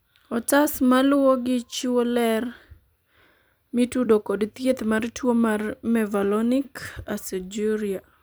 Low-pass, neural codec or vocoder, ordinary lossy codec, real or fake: none; vocoder, 44.1 kHz, 128 mel bands every 256 samples, BigVGAN v2; none; fake